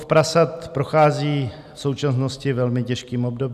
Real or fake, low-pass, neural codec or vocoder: real; 14.4 kHz; none